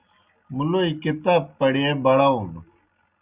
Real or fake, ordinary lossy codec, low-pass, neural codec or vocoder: real; Opus, 64 kbps; 3.6 kHz; none